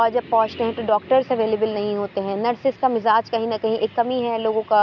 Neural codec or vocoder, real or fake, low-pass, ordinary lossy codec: none; real; 7.2 kHz; none